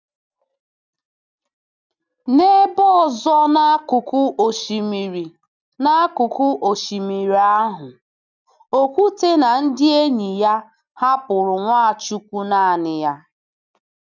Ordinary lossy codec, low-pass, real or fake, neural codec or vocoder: none; 7.2 kHz; real; none